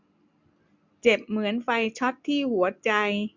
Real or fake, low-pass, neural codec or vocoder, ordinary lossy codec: real; 7.2 kHz; none; none